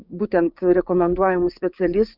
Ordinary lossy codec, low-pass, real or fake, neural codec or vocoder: Opus, 64 kbps; 5.4 kHz; fake; codec, 16 kHz, 6 kbps, DAC